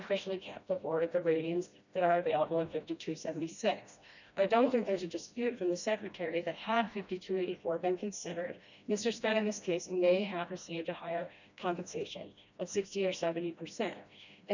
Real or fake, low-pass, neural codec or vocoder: fake; 7.2 kHz; codec, 16 kHz, 1 kbps, FreqCodec, smaller model